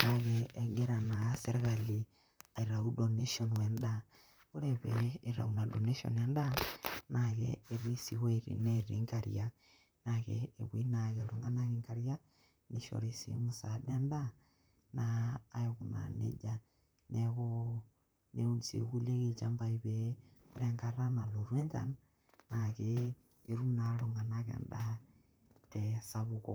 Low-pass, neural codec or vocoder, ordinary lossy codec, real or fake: none; vocoder, 44.1 kHz, 128 mel bands, Pupu-Vocoder; none; fake